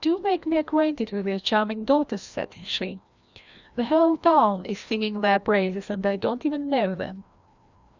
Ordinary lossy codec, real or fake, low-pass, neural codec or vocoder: Opus, 64 kbps; fake; 7.2 kHz; codec, 16 kHz, 1 kbps, FreqCodec, larger model